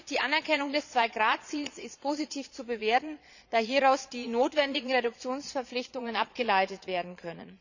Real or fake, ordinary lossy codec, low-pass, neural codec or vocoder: fake; none; 7.2 kHz; vocoder, 22.05 kHz, 80 mel bands, Vocos